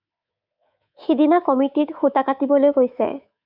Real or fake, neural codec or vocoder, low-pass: fake; codec, 24 kHz, 3.1 kbps, DualCodec; 5.4 kHz